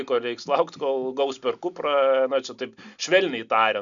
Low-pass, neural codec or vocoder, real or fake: 7.2 kHz; none; real